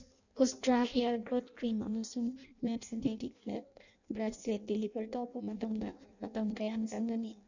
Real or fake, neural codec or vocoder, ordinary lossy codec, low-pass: fake; codec, 16 kHz in and 24 kHz out, 0.6 kbps, FireRedTTS-2 codec; none; 7.2 kHz